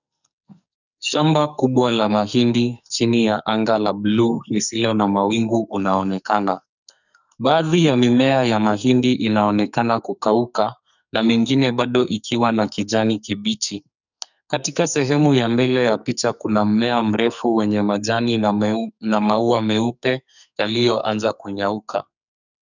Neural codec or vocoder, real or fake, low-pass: codec, 44.1 kHz, 2.6 kbps, SNAC; fake; 7.2 kHz